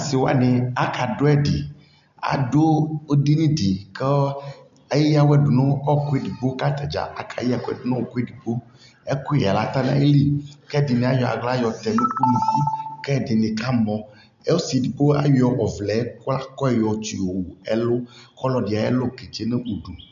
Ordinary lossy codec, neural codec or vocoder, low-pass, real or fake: AAC, 96 kbps; none; 7.2 kHz; real